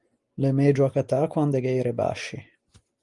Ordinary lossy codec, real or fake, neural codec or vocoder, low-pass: Opus, 32 kbps; real; none; 10.8 kHz